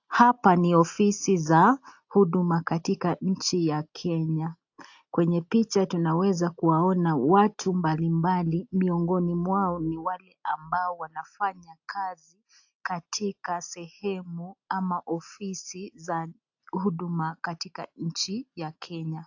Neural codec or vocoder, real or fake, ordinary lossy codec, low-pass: none; real; AAC, 48 kbps; 7.2 kHz